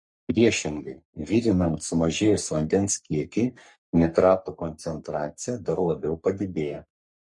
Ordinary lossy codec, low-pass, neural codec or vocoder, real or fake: MP3, 48 kbps; 10.8 kHz; codec, 44.1 kHz, 3.4 kbps, Pupu-Codec; fake